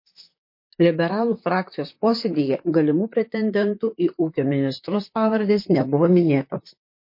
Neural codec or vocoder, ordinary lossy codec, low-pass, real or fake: vocoder, 44.1 kHz, 128 mel bands, Pupu-Vocoder; MP3, 32 kbps; 5.4 kHz; fake